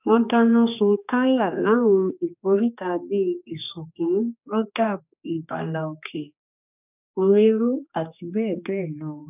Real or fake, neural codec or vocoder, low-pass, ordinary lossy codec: fake; codec, 16 kHz, 2 kbps, X-Codec, HuBERT features, trained on general audio; 3.6 kHz; none